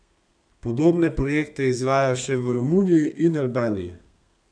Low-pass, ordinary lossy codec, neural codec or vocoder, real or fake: 9.9 kHz; none; codec, 32 kHz, 1.9 kbps, SNAC; fake